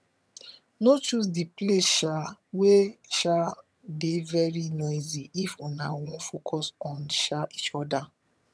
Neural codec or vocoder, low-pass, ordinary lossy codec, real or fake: vocoder, 22.05 kHz, 80 mel bands, HiFi-GAN; none; none; fake